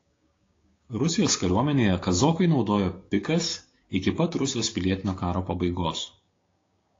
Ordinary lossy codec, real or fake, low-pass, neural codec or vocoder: AAC, 32 kbps; fake; 7.2 kHz; codec, 16 kHz, 6 kbps, DAC